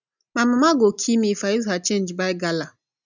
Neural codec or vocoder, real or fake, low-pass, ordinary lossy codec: none; real; 7.2 kHz; none